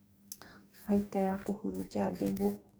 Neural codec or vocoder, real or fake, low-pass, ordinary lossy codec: codec, 44.1 kHz, 2.6 kbps, DAC; fake; none; none